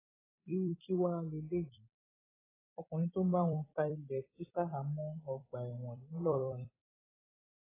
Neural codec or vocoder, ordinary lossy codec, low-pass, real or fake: vocoder, 44.1 kHz, 128 mel bands every 256 samples, BigVGAN v2; AAC, 16 kbps; 3.6 kHz; fake